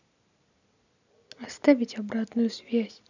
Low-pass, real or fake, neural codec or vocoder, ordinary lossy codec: 7.2 kHz; real; none; none